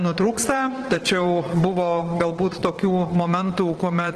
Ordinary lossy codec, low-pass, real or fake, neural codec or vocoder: Opus, 16 kbps; 9.9 kHz; fake; vocoder, 22.05 kHz, 80 mel bands, Vocos